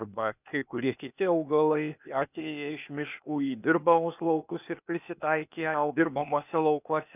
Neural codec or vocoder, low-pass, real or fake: codec, 16 kHz, 0.8 kbps, ZipCodec; 3.6 kHz; fake